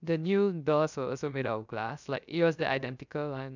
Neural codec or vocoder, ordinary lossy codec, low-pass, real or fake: codec, 16 kHz, 0.3 kbps, FocalCodec; none; 7.2 kHz; fake